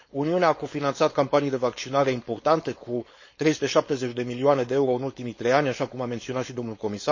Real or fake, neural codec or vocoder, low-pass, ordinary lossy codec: fake; codec, 16 kHz, 4.8 kbps, FACodec; 7.2 kHz; MP3, 32 kbps